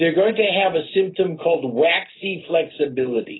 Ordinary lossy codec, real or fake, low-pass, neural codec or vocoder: AAC, 16 kbps; real; 7.2 kHz; none